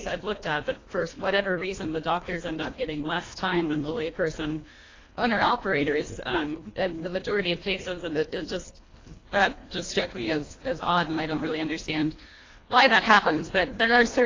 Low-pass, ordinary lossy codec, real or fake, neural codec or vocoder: 7.2 kHz; AAC, 32 kbps; fake; codec, 24 kHz, 1.5 kbps, HILCodec